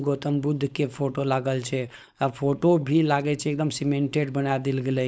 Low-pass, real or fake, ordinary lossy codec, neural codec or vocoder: none; fake; none; codec, 16 kHz, 4.8 kbps, FACodec